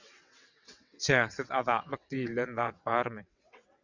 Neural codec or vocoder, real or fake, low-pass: vocoder, 22.05 kHz, 80 mel bands, WaveNeXt; fake; 7.2 kHz